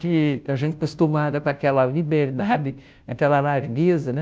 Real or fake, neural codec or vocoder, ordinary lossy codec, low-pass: fake; codec, 16 kHz, 0.5 kbps, FunCodec, trained on Chinese and English, 25 frames a second; none; none